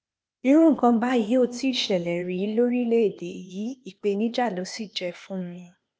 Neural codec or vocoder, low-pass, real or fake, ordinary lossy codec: codec, 16 kHz, 0.8 kbps, ZipCodec; none; fake; none